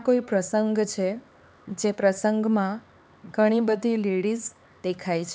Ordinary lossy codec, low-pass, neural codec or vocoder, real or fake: none; none; codec, 16 kHz, 4 kbps, X-Codec, HuBERT features, trained on LibriSpeech; fake